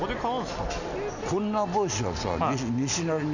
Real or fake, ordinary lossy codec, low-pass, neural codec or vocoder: real; none; 7.2 kHz; none